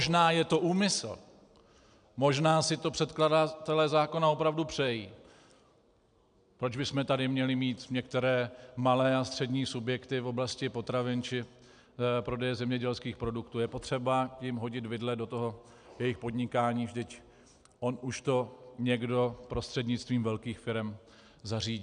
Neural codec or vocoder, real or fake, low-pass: none; real; 9.9 kHz